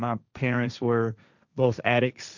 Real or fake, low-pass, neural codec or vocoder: fake; 7.2 kHz; codec, 16 kHz, 1.1 kbps, Voila-Tokenizer